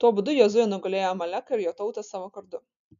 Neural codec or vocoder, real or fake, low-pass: none; real; 7.2 kHz